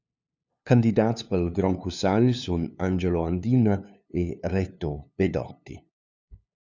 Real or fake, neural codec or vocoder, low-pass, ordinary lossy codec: fake; codec, 16 kHz, 2 kbps, FunCodec, trained on LibriTTS, 25 frames a second; 7.2 kHz; Opus, 64 kbps